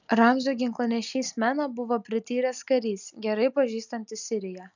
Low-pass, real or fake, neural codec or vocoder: 7.2 kHz; real; none